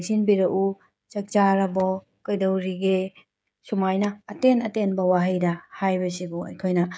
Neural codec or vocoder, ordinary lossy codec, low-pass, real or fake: codec, 16 kHz, 16 kbps, FreqCodec, smaller model; none; none; fake